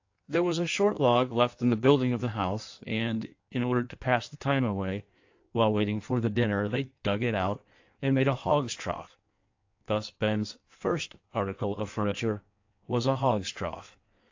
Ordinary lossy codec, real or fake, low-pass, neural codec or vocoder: AAC, 48 kbps; fake; 7.2 kHz; codec, 16 kHz in and 24 kHz out, 1.1 kbps, FireRedTTS-2 codec